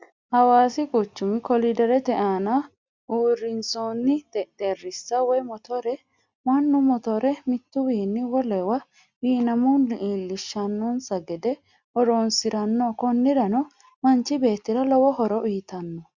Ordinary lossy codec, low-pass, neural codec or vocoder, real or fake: Opus, 64 kbps; 7.2 kHz; none; real